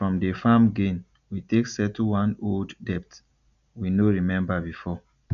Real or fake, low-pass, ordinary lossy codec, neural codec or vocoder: real; 7.2 kHz; none; none